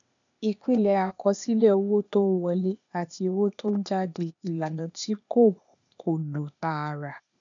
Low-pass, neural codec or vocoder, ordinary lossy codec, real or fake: 7.2 kHz; codec, 16 kHz, 0.8 kbps, ZipCodec; none; fake